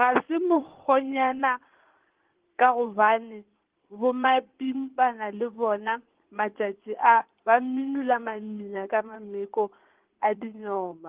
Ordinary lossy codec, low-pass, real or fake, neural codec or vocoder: Opus, 16 kbps; 3.6 kHz; fake; codec, 16 kHz in and 24 kHz out, 2.2 kbps, FireRedTTS-2 codec